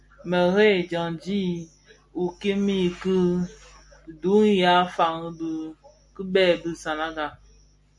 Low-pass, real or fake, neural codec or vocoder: 10.8 kHz; real; none